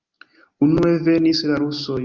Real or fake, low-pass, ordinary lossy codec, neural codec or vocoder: real; 7.2 kHz; Opus, 32 kbps; none